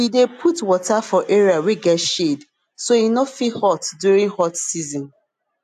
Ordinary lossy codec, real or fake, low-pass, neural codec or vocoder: AAC, 96 kbps; real; 14.4 kHz; none